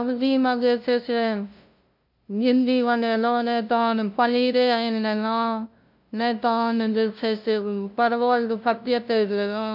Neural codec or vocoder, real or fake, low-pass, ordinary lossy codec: codec, 16 kHz, 0.5 kbps, FunCodec, trained on LibriTTS, 25 frames a second; fake; 5.4 kHz; MP3, 48 kbps